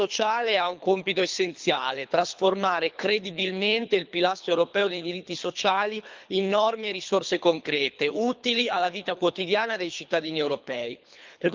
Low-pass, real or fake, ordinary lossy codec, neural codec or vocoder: 7.2 kHz; fake; Opus, 24 kbps; codec, 24 kHz, 3 kbps, HILCodec